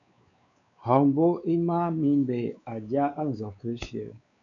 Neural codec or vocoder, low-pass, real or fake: codec, 16 kHz, 4 kbps, X-Codec, WavLM features, trained on Multilingual LibriSpeech; 7.2 kHz; fake